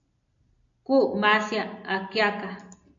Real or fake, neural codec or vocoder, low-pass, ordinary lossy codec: real; none; 7.2 kHz; AAC, 64 kbps